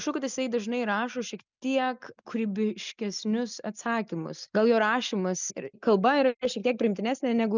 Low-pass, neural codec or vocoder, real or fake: 7.2 kHz; none; real